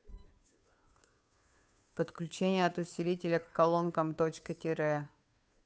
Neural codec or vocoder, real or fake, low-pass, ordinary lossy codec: codec, 16 kHz, 2 kbps, FunCodec, trained on Chinese and English, 25 frames a second; fake; none; none